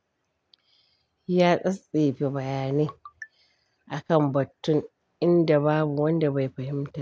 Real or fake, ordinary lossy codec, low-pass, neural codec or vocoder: real; none; none; none